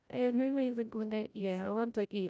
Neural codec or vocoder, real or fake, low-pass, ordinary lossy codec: codec, 16 kHz, 0.5 kbps, FreqCodec, larger model; fake; none; none